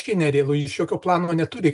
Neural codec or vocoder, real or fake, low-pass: none; real; 10.8 kHz